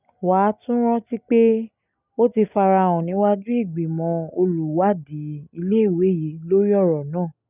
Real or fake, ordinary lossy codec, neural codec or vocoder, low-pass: real; none; none; 3.6 kHz